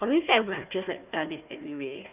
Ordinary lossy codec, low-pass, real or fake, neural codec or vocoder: none; 3.6 kHz; fake; codec, 16 kHz, 1 kbps, FunCodec, trained on Chinese and English, 50 frames a second